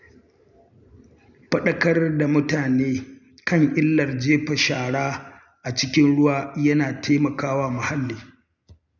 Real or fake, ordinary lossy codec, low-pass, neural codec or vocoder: real; none; 7.2 kHz; none